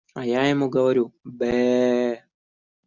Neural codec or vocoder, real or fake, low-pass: none; real; 7.2 kHz